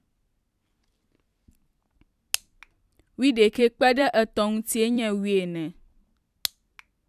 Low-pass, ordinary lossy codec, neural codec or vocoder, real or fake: 14.4 kHz; none; vocoder, 44.1 kHz, 128 mel bands every 256 samples, BigVGAN v2; fake